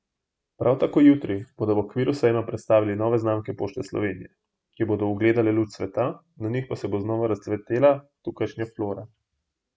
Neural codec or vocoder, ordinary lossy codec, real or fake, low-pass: none; none; real; none